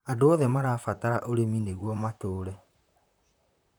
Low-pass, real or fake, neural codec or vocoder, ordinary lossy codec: none; fake; vocoder, 44.1 kHz, 128 mel bands, Pupu-Vocoder; none